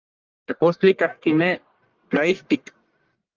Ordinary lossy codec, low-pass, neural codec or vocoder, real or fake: Opus, 24 kbps; 7.2 kHz; codec, 44.1 kHz, 1.7 kbps, Pupu-Codec; fake